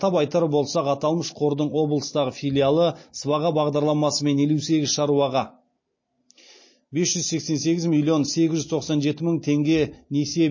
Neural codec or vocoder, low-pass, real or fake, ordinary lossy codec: none; 7.2 kHz; real; MP3, 32 kbps